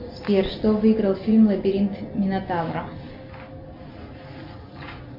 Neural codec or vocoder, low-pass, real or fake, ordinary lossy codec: none; 5.4 kHz; real; MP3, 48 kbps